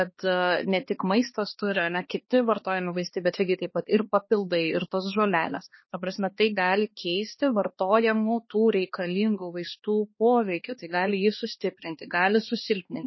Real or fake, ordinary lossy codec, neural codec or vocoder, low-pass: fake; MP3, 24 kbps; codec, 16 kHz, 2 kbps, X-Codec, HuBERT features, trained on balanced general audio; 7.2 kHz